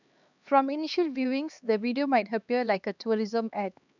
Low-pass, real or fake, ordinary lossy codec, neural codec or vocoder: 7.2 kHz; fake; none; codec, 16 kHz, 2 kbps, X-Codec, HuBERT features, trained on LibriSpeech